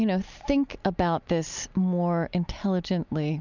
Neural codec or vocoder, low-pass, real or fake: none; 7.2 kHz; real